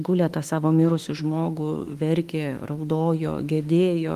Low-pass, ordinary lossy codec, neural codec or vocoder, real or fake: 14.4 kHz; Opus, 24 kbps; autoencoder, 48 kHz, 32 numbers a frame, DAC-VAE, trained on Japanese speech; fake